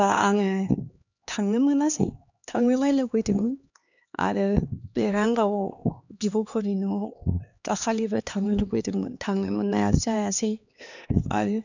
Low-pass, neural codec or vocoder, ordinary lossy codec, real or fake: 7.2 kHz; codec, 16 kHz, 2 kbps, X-Codec, HuBERT features, trained on LibriSpeech; none; fake